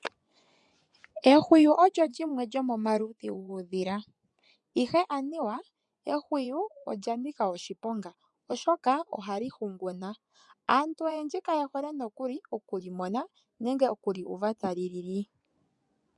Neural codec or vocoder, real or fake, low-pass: vocoder, 24 kHz, 100 mel bands, Vocos; fake; 10.8 kHz